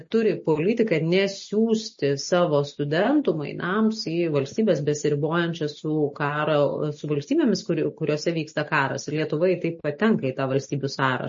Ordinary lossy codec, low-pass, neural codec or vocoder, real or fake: MP3, 32 kbps; 7.2 kHz; none; real